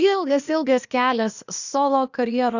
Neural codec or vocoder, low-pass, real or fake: codec, 16 kHz, 0.8 kbps, ZipCodec; 7.2 kHz; fake